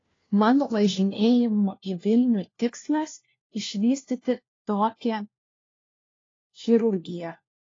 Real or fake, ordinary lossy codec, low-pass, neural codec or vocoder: fake; AAC, 32 kbps; 7.2 kHz; codec, 16 kHz, 1 kbps, FunCodec, trained on LibriTTS, 50 frames a second